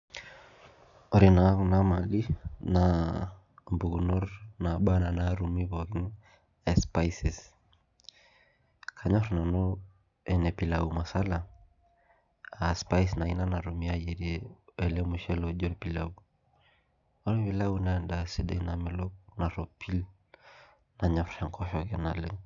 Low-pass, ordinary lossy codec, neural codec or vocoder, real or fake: 7.2 kHz; none; none; real